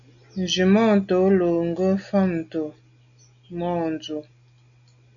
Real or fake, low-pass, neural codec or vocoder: real; 7.2 kHz; none